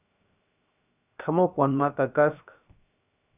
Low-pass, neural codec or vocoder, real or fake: 3.6 kHz; codec, 16 kHz, 0.7 kbps, FocalCodec; fake